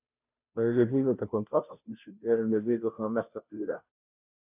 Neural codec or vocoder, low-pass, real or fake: codec, 16 kHz, 0.5 kbps, FunCodec, trained on Chinese and English, 25 frames a second; 3.6 kHz; fake